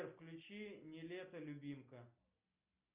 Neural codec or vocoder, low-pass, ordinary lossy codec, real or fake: none; 3.6 kHz; Opus, 64 kbps; real